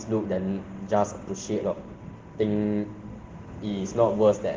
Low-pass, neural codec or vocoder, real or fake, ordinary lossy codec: 7.2 kHz; none; real; Opus, 16 kbps